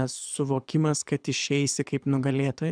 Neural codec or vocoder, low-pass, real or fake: codec, 24 kHz, 6 kbps, HILCodec; 9.9 kHz; fake